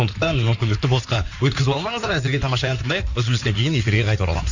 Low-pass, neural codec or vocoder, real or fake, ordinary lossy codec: 7.2 kHz; codec, 16 kHz in and 24 kHz out, 2.2 kbps, FireRedTTS-2 codec; fake; none